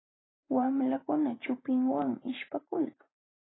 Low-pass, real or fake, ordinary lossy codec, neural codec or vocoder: 7.2 kHz; fake; AAC, 16 kbps; vocoder, 44.1 kHz, 128 mel bands every 512 samples, BigVGAN v2